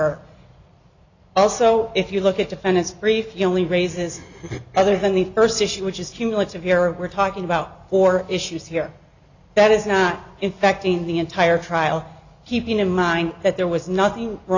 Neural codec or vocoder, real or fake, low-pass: none; real; 7.2 kHz